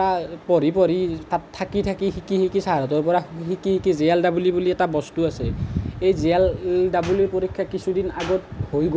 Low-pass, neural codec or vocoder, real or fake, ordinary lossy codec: none; none; real; none